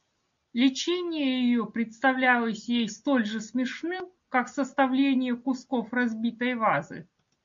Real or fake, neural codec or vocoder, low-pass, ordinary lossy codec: real; none; 7.2 kHz; MP3, 48 kbps